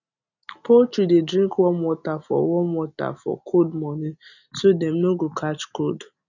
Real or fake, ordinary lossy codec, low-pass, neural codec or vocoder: real; none; 7.2 kHz; none